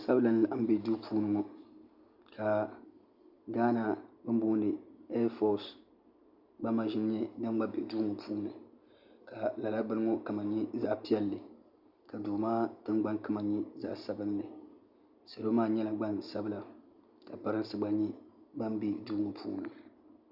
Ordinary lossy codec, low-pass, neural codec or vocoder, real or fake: Opus, 64 kbps; 5.4 kHz; none; real